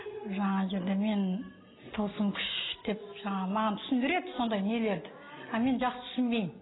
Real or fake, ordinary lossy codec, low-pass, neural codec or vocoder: real; AAC, 16 kbps; 7.2 kHz; none